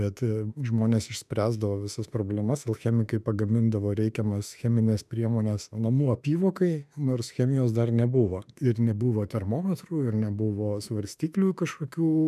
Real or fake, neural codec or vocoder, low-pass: fake; autoencoder, 48 kHz, 32 numbers a frame, DAC-VAE, trained on Japanese speech; 14.4 kHz